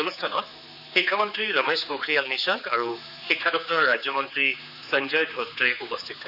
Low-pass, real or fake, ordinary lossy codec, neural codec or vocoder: 5.4 kHz; fake; none; codec, 16 kHz, 4 kbps, X-Codec, HuBERT features, trained on general audio